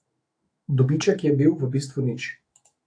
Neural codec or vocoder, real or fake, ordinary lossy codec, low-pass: autoencoder, 48 kHz, 128 numbers a frame, DAC-VAE, trained on Japanese speech; fake; AAC, 48 kbps; 9.9 kHz